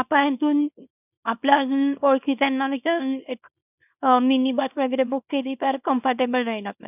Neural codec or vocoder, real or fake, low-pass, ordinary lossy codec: codec, 24 kHz, 0.9 kbps, WavTokenizer, small release; fake; 3.6 kHz; none